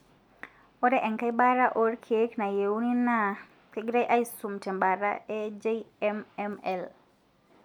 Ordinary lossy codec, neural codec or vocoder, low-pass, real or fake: none; none; 19.8 kHz; real